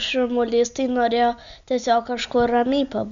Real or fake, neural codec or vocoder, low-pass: real; none; 7.2 kHz